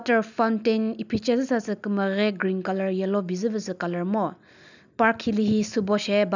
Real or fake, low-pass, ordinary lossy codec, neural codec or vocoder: real; 7.2 kHz; none; none